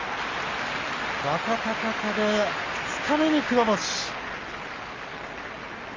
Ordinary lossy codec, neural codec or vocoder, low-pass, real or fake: Opus, 32 kbps; none; 7.2 kHz; real